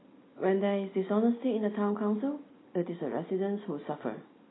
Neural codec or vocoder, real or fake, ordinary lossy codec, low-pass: none; real; AAC, 16 kbps; 7.2 kHz